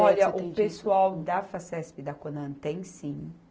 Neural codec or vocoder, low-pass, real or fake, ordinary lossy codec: none; none; real; none